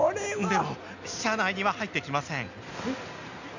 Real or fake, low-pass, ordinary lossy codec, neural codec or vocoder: real; 7.2 kHz; none; none